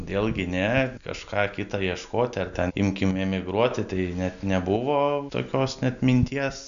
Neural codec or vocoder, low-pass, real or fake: none; 7.2 kHz; real